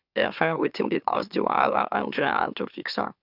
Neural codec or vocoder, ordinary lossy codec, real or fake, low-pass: autoencoder, 44.1 kHz, a latent of 192 numbers a frame, MeloTTS; none; fake; 5.4 kHz